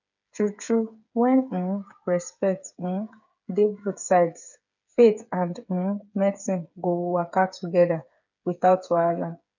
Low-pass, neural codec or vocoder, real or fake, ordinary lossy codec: 7.2 kHz; codec, 16 kHz, 8 kbps, FreqCodec, smaller model; fake; none